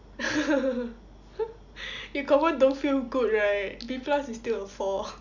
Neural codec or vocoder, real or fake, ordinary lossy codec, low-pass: none; real; none; 7.2 kHz